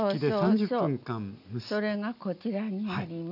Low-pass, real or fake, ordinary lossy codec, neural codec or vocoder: 5.4 kHz; real; none; none